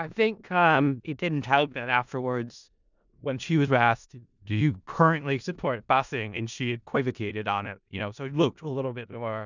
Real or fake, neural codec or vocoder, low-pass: fake; codec, 16 kHz in and 24 kHz out, 0.4 kbps, LongCat-Audio-Codec, four codebook decoder; 7.2 kHz